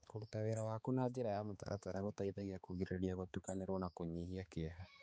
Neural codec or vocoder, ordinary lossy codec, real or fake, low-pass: codec, 16 kHz, 4 kbps, X-Codec, HuBERT features, trained on balanced general audio; none; fake; none